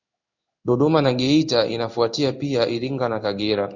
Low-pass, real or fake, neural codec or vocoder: 7.2 kHz; fake; codec, 16 kHz in and 24 kHz out, 1 kbps, XY-Tokenizer